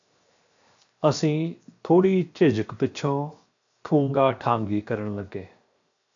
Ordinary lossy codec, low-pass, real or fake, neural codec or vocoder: MP3, 64 kbps; 7.2 kHz; fake; codec, 16 kHz, 0.7 kbps, FocalCodec